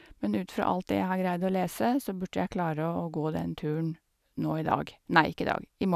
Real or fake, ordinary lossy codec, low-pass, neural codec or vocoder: real; none; 14.4 kHz; none